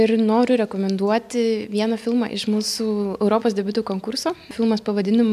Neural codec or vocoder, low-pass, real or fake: none; 14.4 kHz; real